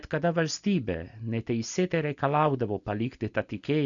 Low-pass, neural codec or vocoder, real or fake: 7.2 kHz; none; real